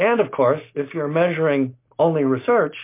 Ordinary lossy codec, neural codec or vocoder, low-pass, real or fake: MP3, 24 kbps; none; 3.6 kHz; real